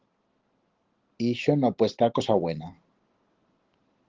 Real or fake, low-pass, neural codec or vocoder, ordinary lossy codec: real; 7.2 kHz; none; Opus, 16 kbps